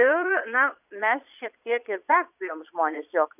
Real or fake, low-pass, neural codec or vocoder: fake; 3.6 kHz; vocoder, 22.05 kHz, 80 mel bands, Vocos